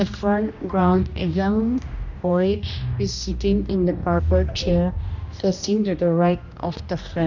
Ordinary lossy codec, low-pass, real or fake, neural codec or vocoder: none; 7.2 kHz; fake; codec, 16 kHz, 1 kbps, X-Codec, HuBERT features, trained on general audio